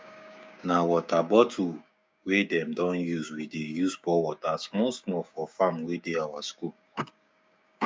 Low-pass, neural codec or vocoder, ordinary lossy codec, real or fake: none; codec, 16 kHz, 6 kbps, DAC; none; fake